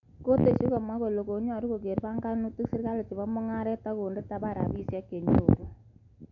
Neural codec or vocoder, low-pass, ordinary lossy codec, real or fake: none; 7.2 kHz; none; real